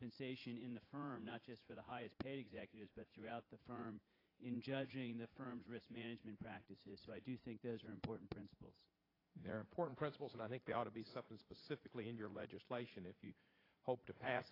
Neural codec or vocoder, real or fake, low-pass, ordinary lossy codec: vocoder, 44.1 kHz, 80 mel bands, Vocos; fake; 5.4 kHz; AAC, 24 kbps